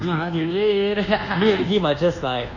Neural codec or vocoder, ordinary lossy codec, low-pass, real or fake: codec, 24 kHz, 1.2 kbps, DualCodec; none; 7.2 kHz; fake